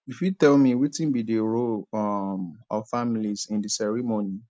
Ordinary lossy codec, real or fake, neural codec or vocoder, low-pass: none; real; none; none